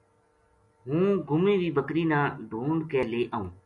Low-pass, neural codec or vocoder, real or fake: 10.8 kHz; none; real